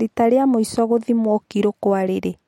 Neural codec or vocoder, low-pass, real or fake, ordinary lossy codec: none; 19.8 kHz; real; MP3, 64 kbps